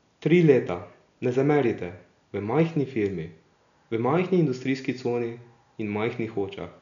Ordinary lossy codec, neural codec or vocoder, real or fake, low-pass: none; none; real; 7.2 kHz